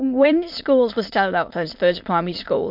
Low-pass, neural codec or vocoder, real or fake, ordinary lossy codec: 5.4 kHz; autoencoder, 22.05 kHz, a latent of 192 numbers a frame, VITS, trained on many speakers; fake; MP3, 48 kbps